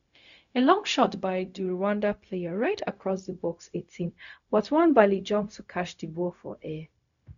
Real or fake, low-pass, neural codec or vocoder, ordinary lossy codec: fake; 7.2 kHz; codec, 16 kHz, 0.4 kbps, LongCat-Audio-Codec; none